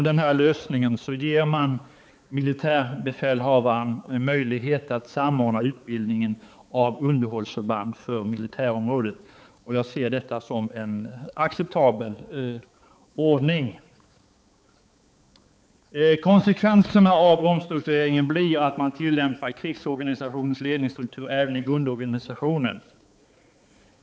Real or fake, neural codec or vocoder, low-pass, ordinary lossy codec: fake; codec, 16 kHz, 4 kbps, X-Codec, HuBERT features, trained on balanced general audio; none; none